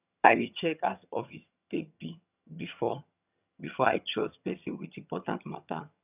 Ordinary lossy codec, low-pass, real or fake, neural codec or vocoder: none; 3.6 kHz; fake; vocoder, 22.05 kHz, 80 mel bands, HiFi-GAN